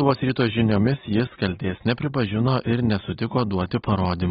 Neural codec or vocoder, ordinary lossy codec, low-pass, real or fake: none; AAC, 16 kbps; 19.8 kHz; real